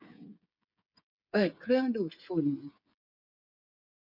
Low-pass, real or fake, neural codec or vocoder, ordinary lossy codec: 5.4 kHz; fake; codec, 16 kHz, 8 kbps, FreqCodec, smaller model; none